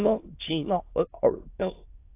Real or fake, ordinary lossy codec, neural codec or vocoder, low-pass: fake; AAC, 24 kbps; autoencoder, 22.05 kHz, a latent of 192 numbers a frame, VITS, trained on many speakers; 3.6 kHz